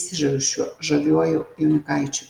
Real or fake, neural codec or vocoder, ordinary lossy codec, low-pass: real; none; Opus, 24 kbps; 19.8 kHz